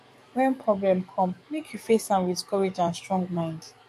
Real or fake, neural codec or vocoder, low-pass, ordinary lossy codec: fake; codec, 44.1 kHz, 7.8 kbps, DAC; 14.4 kHz; MP3, 64 kbps